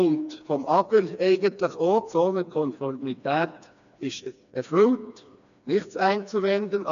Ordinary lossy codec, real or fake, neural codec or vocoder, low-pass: none; fake; codec, 16 kHz, 2 kbps, FreqCodec, smaller model; 7.2 kHz